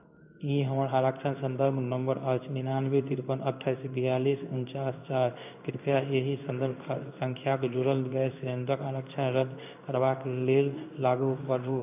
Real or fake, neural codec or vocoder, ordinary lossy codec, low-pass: fake; codec, 16 kHz in and 24 kHz out, 1 kbps, XY-Tokenizer; AAC, 32 kbps; 3.6 kHz